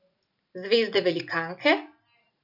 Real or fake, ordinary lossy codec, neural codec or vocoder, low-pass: real; none; none; 5.4 kHz